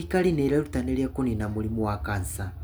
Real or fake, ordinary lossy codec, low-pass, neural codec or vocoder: real; none; none; none